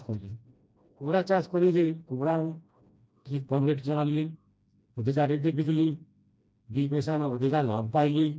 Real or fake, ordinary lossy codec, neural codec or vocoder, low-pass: fake; none; codec, 16 kHz, 1 kbps, FreqCodec, smaller model; none